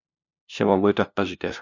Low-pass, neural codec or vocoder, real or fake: 7.2 kHz; codec, 16 kHz, 0.5 kbps, FunCodec, trained on LibriTTS, 25 frames a second; fake